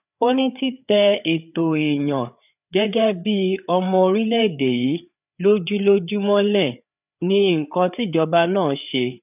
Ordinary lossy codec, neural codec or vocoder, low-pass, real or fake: none; codec, 16 kHz, 4 kbps, FreqCodec, larger model; 3.6 kHz; fake